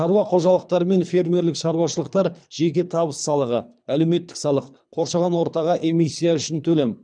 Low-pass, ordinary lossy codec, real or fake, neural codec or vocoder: 9.9 kHz; none; fake; codec, 24 kHz, 3 kbps, HILCodec